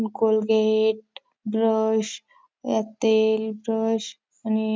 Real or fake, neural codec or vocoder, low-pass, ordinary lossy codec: real; none; none; none